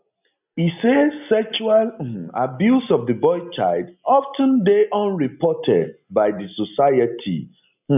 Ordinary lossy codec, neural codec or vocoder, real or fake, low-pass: none; none; real; 3.6 kHz